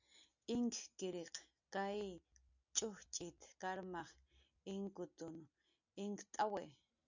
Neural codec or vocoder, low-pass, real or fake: none; 7.2 kHz; real